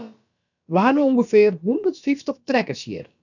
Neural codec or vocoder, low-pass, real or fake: codec, 16 kHz, about 1 kbps, DyCAST, with the encoder's durations; 7.2 kHz; fake